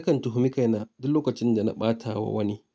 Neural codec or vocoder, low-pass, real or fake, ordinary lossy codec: none; none; real; none